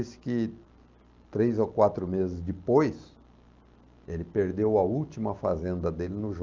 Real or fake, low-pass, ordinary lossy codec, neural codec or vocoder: real; 7.2 kHz; Opus, 24 kbps; none